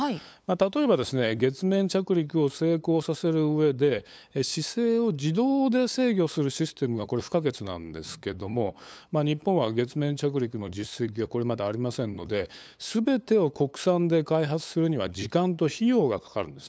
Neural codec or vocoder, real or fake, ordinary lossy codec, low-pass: codec, 16 kHz, 8 kbps, FunCodec, trained on LibriTTS, 25 frames a second; fake; none; none